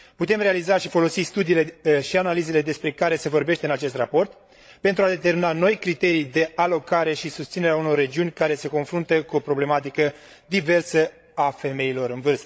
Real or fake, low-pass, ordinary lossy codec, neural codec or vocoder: fake; none; none; codec, 16 kHz, 16 kbps, FreqCodec, larger model